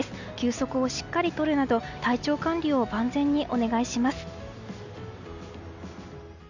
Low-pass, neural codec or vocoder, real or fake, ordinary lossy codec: 7.2 kHz; none; real; none